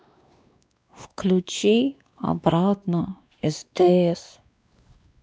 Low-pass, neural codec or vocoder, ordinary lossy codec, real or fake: none; codec, 16 kHz, 2 kbps, X-Codec, WavLM features, trained on Multilingual LibriSpeech; none; fake